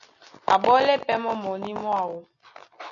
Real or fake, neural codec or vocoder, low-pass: real; none; 7.2 kHz